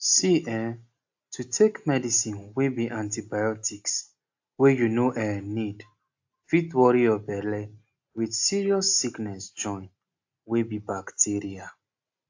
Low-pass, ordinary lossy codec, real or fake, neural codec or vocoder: 7.2 kHz; AAC, 48 kbps; real; none